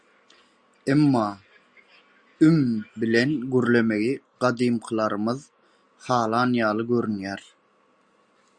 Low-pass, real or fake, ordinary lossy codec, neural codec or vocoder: 9.9 kHz; real; Opus, 64 kbps; none